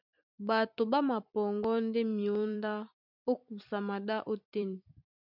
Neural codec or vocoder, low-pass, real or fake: vocoder, 44.1 kHz, 128 mel bands every 256 samples, BigVGAN v2; 5.4 kHz; fake